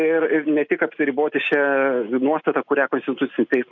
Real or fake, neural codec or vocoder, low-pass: real; none; 7.2 kHz